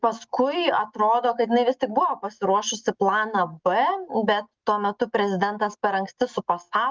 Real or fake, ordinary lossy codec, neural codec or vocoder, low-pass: real; Opus, 24 kbps; none; 7.2 kHz